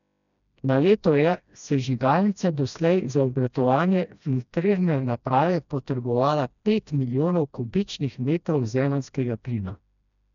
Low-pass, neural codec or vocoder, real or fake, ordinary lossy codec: 7.2 kHz; codec, 16 kHz, 1 kbps, FreqCodec, smaller model; fake; none